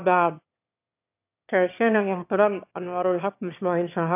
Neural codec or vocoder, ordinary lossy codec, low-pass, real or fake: autoencoder, 22.05 kHz, a latent of 192 numbers a frame, VITS, trained on one speaker; AAC, 32 kbps; 3.6 kHz; fake